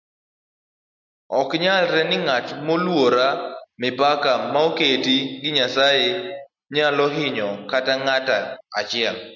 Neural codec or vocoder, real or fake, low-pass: none; real; 7.2 kHz